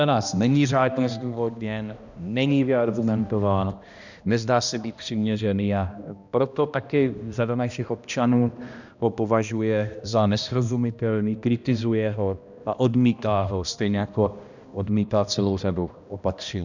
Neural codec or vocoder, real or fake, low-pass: codec, 16 kHz, 1 kbps, X-Codec, HuBERT features, trained on balanced general audio; fake; 7.2 kHz